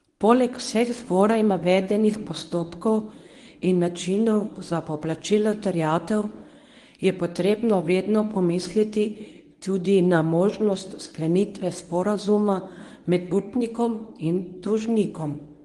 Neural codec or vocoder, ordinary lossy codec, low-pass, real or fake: codec, 24 kHz, 0.9 kbps, WavTokenizer, medium speech release version 1; Opus, 24 kbps; 10.8 kHz; fake